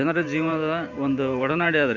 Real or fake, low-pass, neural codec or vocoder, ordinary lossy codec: fake; 7.2 kHz; vocoder, 44.1 kHz, 128 mel bands every 512 samples, BigVGAN v2; none